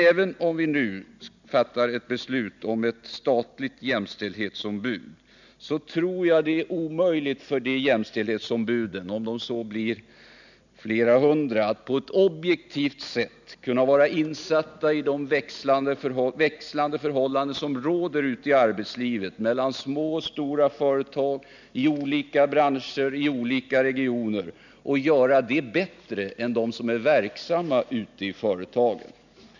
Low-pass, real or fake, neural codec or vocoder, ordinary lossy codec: 7.2 kHz; real; none; none